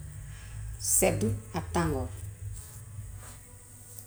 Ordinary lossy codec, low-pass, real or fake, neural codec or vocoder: none; none; real; none